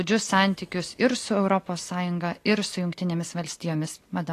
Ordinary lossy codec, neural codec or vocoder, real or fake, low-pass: AAC, 48 kbps; none; real; 14.4 kHz